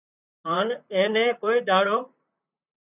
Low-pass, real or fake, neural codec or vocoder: 3.6 kHz; fake; vocoder, 44.1 kHz, 128 mel bands, Pupu-Vocoder